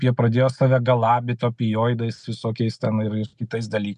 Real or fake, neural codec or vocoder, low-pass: real; none; 10.8 kHz